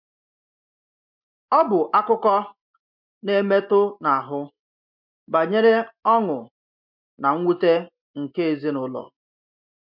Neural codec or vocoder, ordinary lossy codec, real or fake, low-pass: none; MP3, 48 kbps; real; 5.4 kHz